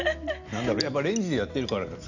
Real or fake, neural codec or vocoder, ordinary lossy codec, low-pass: real; none; none; 7.2 kHz